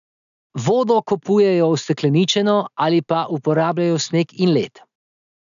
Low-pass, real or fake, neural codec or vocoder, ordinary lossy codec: 7.2 kHz; real; none; none